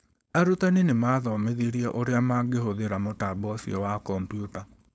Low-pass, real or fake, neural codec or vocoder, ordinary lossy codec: none; fake; codec, 16 kHz, 4.8 kbps, FACodec; none